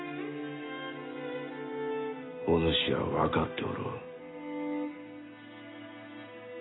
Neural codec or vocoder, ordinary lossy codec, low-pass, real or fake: none; AAC, 16 kbps; 7.2 kHz; real